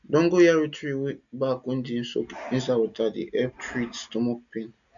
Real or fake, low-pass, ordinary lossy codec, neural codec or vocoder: real; 7.2 kHz; none; none